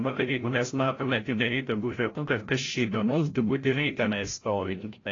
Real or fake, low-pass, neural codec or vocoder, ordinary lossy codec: fake; 7.2 kHz; codec, 16 kHz, 0.5 kbps, FreqCodec, larger model; AAC, 32 kbps